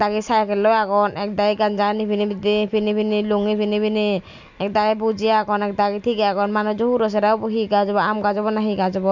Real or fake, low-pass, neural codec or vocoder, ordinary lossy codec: real; 7.2 kHz; none; none